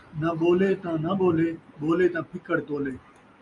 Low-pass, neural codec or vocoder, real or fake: 10.8 kHz; none; real